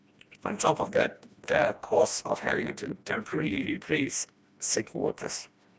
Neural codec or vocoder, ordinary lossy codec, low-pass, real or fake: codec, 16 kHz, 1 kbps, FreqCodec, smaller model; none; none; fake